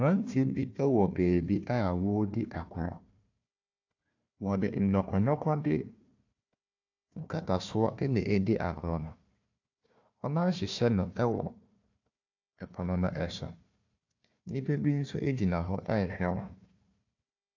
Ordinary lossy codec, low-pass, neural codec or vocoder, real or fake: AAC, 48 kbps; 7.2 kHz; codec, 16 kHz, 1 kbps, FunCodec, trained on Chinese and English, 50 frames a second; fake